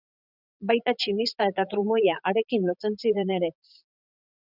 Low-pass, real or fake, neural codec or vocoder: 5.4 kHz; fake; vocoder, 44.1 kHz, 80 mel bands, Vocos